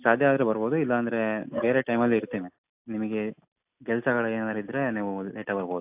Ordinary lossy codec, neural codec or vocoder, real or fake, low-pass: AAC, 32 kbps; none; real; 3.6 kHz